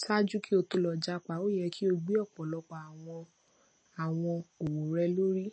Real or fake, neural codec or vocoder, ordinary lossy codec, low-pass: real; none; MP3, 32 kbps; 9.9 kHz